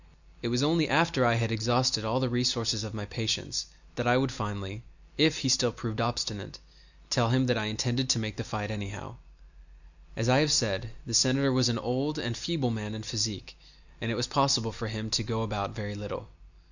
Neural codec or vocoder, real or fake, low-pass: none; real; 7.2 kHz